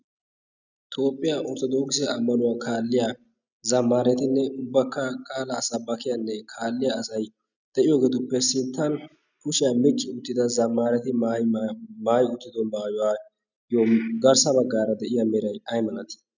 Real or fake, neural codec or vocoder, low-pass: real; none; 7.2 kHz